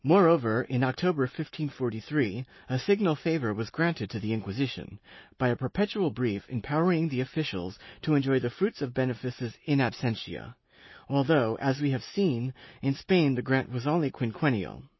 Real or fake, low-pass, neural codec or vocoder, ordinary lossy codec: real; 7.2 kHz; none; MP3, 24 kbps